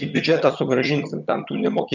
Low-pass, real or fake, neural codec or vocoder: 7.2 kHz; fake; vocoder, 22.05 kHz, 80 mel bands, HiFi-GAN